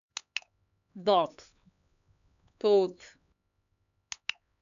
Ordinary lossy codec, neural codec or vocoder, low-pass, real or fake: none; codec, 16 kHz, 4 kbps, X-Codec, HuBERT features, trained on LibriSpeech; 7.2 kHz; fake